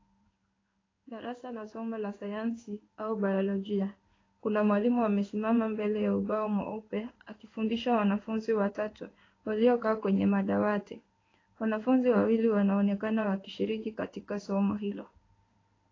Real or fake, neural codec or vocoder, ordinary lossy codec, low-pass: fake; codec, 16 kHz in and 24 kHz out, 1 kbps, XY-Tokenizer; AAC, 32 kbps; 7.2 kHz